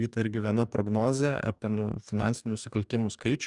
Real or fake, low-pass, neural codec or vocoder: fake; 10.8 kHz; codec, 44.1 kHz, 2.6 kbps, DAC